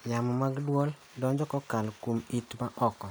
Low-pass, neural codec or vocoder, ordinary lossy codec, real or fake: none; none; none; real